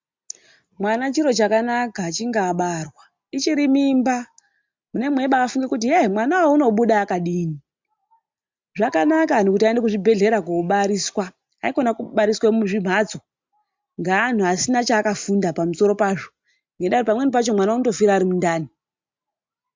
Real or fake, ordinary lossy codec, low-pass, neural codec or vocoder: real; MP3, 64 kbps; 7.2 kHz; none